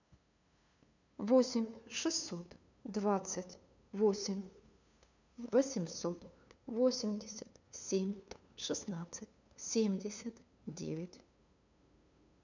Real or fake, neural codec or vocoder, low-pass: fake; codec, 16 kHz, 2 kbps, FunCodec, trained on LibriTTS, 25 frames a second; 7.2 kHz